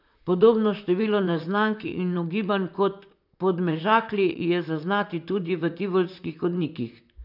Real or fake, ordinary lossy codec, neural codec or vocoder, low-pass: fake; none; vocoder, 44.1 kHz, 128 mel bands, Pupu-Vocoder; 5.4 kHz